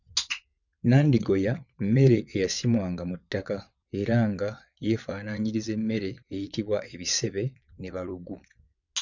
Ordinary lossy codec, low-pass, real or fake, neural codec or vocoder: none; 7.2 kHz; fake; vocoder, 22.05 kHz, 80 mel bands, WaveNeXt